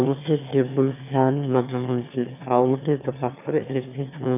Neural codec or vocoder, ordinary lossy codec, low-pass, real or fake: autoencoder, 22.05 kHz, a latent of 192 numbers a frame, VITS, trained on one speaker; none; 3.6 kHz; fake